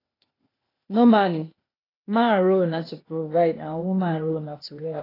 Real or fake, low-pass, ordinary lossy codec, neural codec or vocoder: fake; 5.4 kHz; AAC, 24 kbps; codec, 16 kHz, 0.8 kbps, ZipCodec